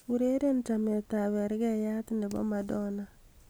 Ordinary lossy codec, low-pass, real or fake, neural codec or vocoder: none; none; real; none